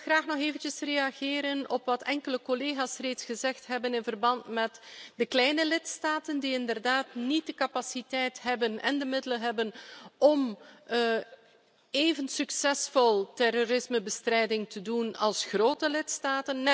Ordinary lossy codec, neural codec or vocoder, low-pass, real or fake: none; none; none; real